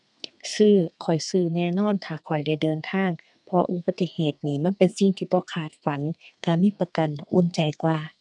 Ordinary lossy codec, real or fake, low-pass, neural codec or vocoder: none; fake; 10.8 kHz; codec, 32 kHz, 1.9 kbps, SNAC